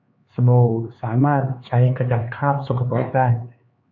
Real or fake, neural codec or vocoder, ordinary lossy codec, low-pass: fake; codec, 16 kHz, 4 kbps, X-Codec, HuBERT features, trained on LibriSpeech; MP3, 48 kbps; 7.2 kHz